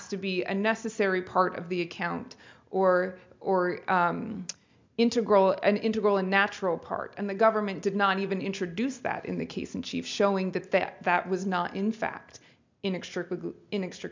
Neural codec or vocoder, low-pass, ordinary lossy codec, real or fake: none; 7.2 kHz; MP3, 64 kbps; real